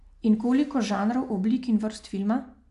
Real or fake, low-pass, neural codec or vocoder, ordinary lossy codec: real; 10.8 kHz; none; MP3, 64 kbps